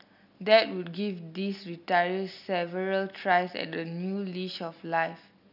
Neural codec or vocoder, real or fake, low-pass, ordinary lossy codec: none; real; 5.4 kHz; none